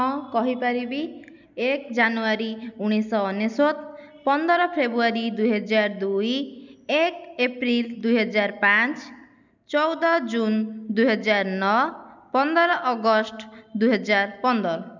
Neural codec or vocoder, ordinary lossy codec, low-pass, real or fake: none; none; 7.2 kHz; real